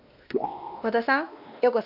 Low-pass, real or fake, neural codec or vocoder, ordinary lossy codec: 5.4 kHz; fake; codec, 16 kHz, 1 kbps, X-Codec, WavLM features, trained on Multilingual LibriSpeech; none